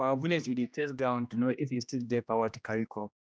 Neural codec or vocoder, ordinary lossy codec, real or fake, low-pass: codec, 16 kHz, 1 kbps, X-Codec, HuBERT features, trained on general audio; none; fake; none